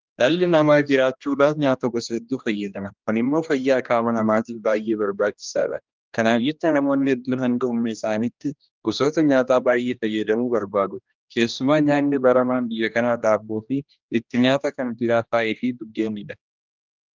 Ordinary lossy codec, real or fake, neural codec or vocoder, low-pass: Opus, 24 kbps; fake; codec, 16 kHz, 1 kbps, X-Codec, HuBERT features, trained on general audio; 7.2 kHz